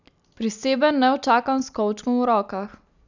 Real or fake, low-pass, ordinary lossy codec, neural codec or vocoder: real; 7.2 kHz; none; none